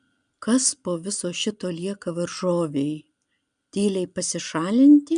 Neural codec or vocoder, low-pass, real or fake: none; 9.9 kHz; real